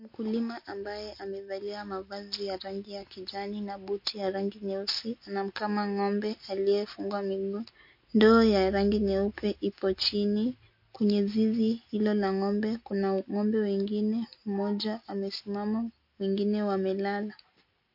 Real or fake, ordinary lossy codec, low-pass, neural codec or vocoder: real; MP3, 32 kbps; 5.4 kHz; none